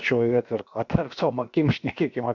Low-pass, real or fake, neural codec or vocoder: 7.2 kHz; fake; codec, 16 kHz, about 1 kbps, DyCAST, with the encoder's durations